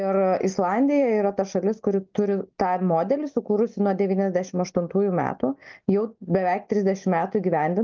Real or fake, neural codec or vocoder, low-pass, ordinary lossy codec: real; none; 7.2 kHz; Opus, 24 kbps